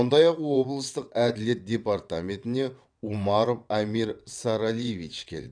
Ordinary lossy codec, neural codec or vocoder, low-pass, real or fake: none; vocoder, 22.05 kHz, 80 mel bands, WaveNeXt; 9.9 kHz; fake